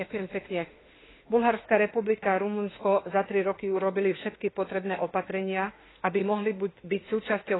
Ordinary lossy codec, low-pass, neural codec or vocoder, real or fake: AAC, 16 kbps; 7.2 kHz; autoencoder, 48 kHz, 32 numbers a frame, DAC-VAE, trained on Japanese speech; fake